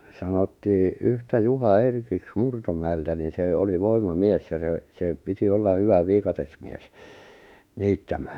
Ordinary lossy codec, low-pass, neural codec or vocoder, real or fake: none; 19.8 kHz; autoencoder, 48 kHz, 32 numbers a frame, DAC-VAE, trained on Japanese speech; fake